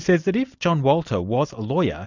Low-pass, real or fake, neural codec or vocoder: 7.2 kHz; real; none